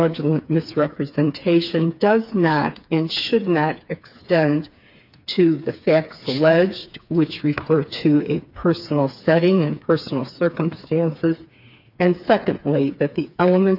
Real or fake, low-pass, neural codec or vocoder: fake; 5.4 kHz; codec, 16 kHz, 4 kbps, FreqCodec, smaller model